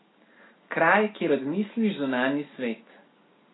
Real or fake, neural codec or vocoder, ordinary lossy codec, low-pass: real; none; AAC, 16 kbps; 7.2 kHz